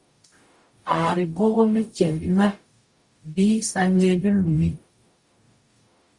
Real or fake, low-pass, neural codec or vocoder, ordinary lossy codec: fake; 10.8 kHz; codec, 44.1 kHz, 0.9 kbps, DAC; Opus, 64 kbps